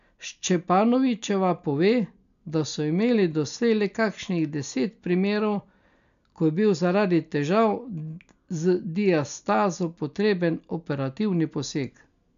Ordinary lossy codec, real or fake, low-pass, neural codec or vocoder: none; real; 7.2 kHz; none